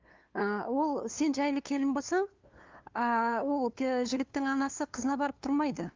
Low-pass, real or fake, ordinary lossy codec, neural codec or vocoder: 7.2 kHz; fake; Opus, 24 kbps; codec, 16 kHz, 2 kbps, FunCodec, trained on LibriTTS, 25 frames a second